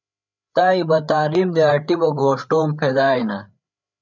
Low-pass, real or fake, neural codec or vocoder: 7.2 kHz; fake; codec, 16 kHz, 8 kbps, FreqCodec, larger model